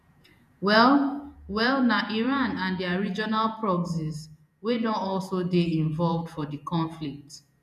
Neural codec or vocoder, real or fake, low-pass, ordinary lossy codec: vocoder, 48 kHz, 128 mel bands, Vocos; fake; 14.4 kHz; none